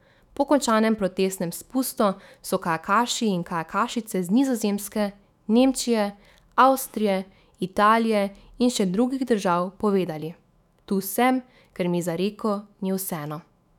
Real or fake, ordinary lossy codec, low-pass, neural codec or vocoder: fake; none; 19.8 kHz; autoencoder, 48 kHz, 128 numbers a frame, DAC-VAE, trained on Japanese speech